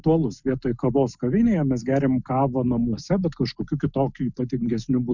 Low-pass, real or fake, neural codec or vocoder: 7.2 kHz; real; none